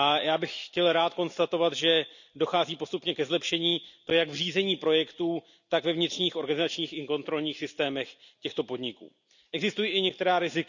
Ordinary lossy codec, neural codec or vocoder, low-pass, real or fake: none; none; 7.2 kHz; real